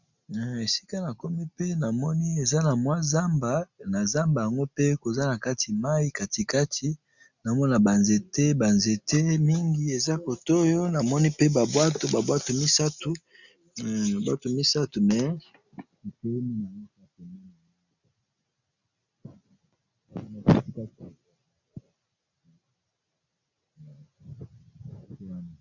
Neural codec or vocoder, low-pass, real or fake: none; 7.2 kHz; real